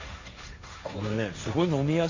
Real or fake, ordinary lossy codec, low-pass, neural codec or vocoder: fake; AAC, 48 kbps; 7.2 kHz; codec, 16 kHz, 1.1 kbps, Voila-Tokenizer